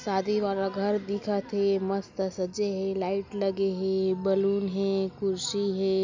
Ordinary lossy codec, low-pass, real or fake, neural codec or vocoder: MP3, 64 kbps; 7.2 kHz; real; none